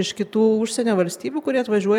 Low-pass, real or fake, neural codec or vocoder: 19.8 kHz; fake; vocoder, 44.1 kHz, 128 mel bands every 512 samples, BigVGAN v2